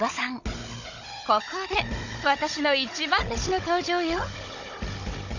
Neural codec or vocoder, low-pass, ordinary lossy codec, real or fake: codec, 16 kHz, 16 kbps, FunCodec, trained on Chinese and English, 50 frames a second; 7.2 kHz; none; fake